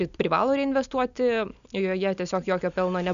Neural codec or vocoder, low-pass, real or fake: none; 7.2 kHz; real